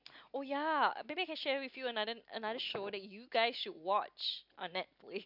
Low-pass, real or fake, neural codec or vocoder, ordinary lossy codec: 5.4 kHz; real; none; none